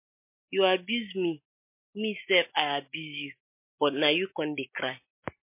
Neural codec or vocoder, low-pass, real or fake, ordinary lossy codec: none; 3.6 kHz; real; MP3, 24 kbps